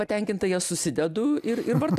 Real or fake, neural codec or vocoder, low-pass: real; none; 14.4 kHz